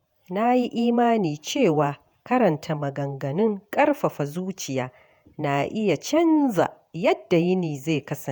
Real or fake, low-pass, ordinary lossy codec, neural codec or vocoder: fake; none; none; vocoder, 48 kHz, 128 mel bands, Vocos